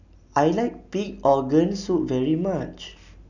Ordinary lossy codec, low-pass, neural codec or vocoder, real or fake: none; 7.2 kHz; none; real